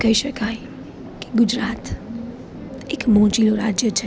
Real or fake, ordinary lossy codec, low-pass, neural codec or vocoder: real; none; none; none